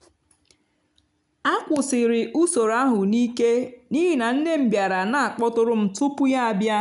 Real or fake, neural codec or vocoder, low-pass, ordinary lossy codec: real; none; 10.8 kHz; none